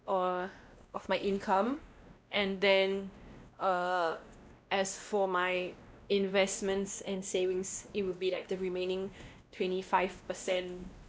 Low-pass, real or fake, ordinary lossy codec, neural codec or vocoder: none; fake; none; codec, 16 kHz, 1 kbps, X-Codec, WavLM features, trained on Multilingual LibriSpeech